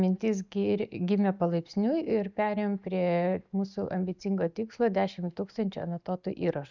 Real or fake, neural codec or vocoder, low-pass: real; none; 7.2 kHz